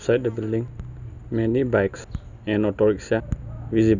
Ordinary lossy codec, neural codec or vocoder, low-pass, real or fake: none; none; 7.2 kHz; real